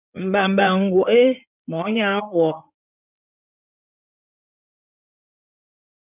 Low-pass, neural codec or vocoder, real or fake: 3.6 kHz; codec, 16 kHz in and 24 kHz out, 2.2 kbps, FireRedTTS-2 codec; fake